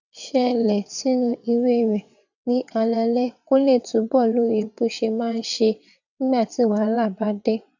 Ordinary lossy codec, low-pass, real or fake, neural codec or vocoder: none; 7.2 kHz; fake; vocoder, 22.05 kHz, 80 mel bands, WaveNeXt